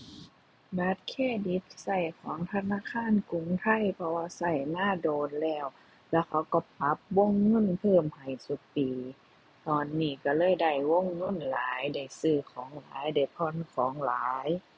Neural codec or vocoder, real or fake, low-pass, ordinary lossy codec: none; real; none; none